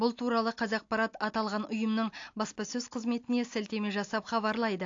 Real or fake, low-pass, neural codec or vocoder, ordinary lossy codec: real; 7.2 kHz; none; AAC, 48 kbps